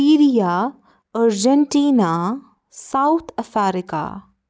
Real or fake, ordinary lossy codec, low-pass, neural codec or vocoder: real; none; none; none